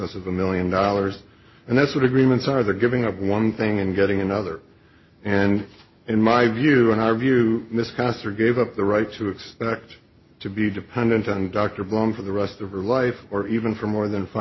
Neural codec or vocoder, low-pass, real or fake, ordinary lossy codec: none; 7.2 kHz; real; MP3, 24 kbps